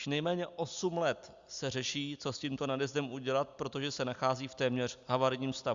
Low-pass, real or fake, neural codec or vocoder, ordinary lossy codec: 7.2 kHz; real; none; Opus, 64 kbps